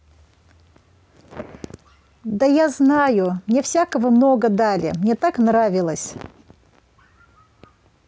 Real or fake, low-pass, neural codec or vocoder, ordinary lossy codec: real; none; none; none